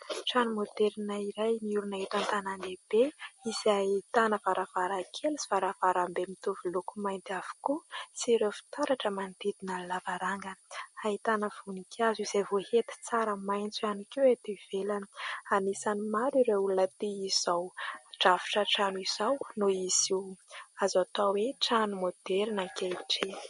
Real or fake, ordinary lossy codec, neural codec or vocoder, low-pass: real; MP3, 48 kbps; none; 19.8 kHz